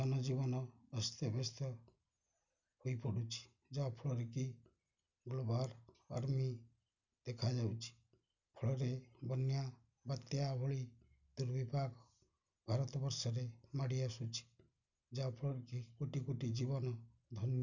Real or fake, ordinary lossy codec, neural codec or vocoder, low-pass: real; none; none; 7.2 kHz